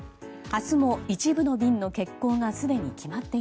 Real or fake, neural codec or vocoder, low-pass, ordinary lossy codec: real; none; none; none